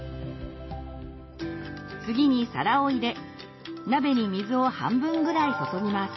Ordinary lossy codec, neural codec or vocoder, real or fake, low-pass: MP3, 24 kbps; none; real; 7.2 kHz